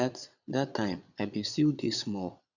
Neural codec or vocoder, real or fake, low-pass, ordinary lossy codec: vocoder, 22.05 kHz, 80 mel bands, Vocos; fake; 7.2 kHz; none